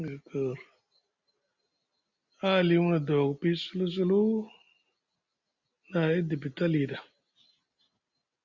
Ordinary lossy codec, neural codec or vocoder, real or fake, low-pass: Opus, 64 kbps; none; real; 7.2 kHz